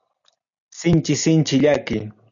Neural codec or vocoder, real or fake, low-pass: none; real; 7.2 kHz